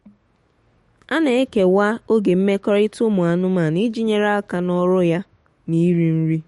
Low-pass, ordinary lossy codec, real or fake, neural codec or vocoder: 19.8 kHz; MP3, 48 kbps; fake; autoencoder, 48 kHz, 128 numbers a frame, DAC-VAE, trained on Japanese speech